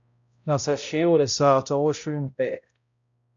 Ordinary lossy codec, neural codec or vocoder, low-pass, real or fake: MP3, 64 kbps; codec, 16 kHz, 0.5 kbps, X-Codec, HuBERT features, trained on balanced general audio; 7.2 kHz; fake